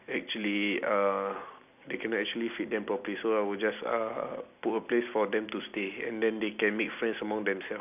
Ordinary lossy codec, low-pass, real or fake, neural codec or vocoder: none; 3.6 kHz; real; none